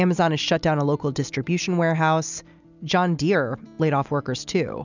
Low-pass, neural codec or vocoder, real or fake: 7.2 kHz; none; real